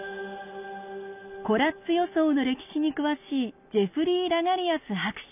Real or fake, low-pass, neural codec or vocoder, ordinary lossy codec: real; 3.6 kHz; none; none